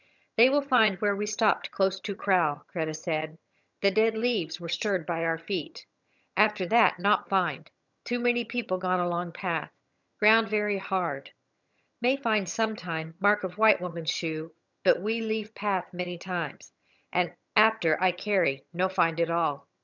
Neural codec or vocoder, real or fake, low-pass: vocoder, 22.05 kHz, 80 mel bands, HiFi-GAN; fake; 7.2 kHz